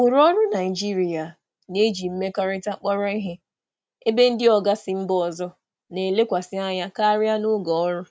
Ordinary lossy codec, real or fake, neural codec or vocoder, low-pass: none; real; none; none